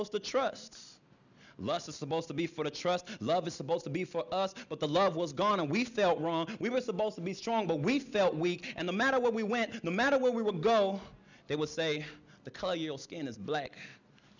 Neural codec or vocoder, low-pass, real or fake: none; 7.2 kHz; real